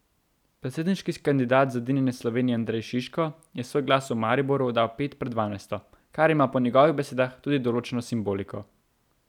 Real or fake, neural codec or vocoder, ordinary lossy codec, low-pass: real; none; none; 19.8 kHz